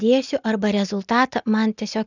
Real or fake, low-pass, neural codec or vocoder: real; 7.2 kHz; none